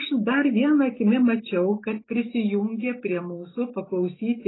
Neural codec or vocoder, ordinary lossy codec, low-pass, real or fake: none; AAC, 16 kbps; 7.2 kHz; real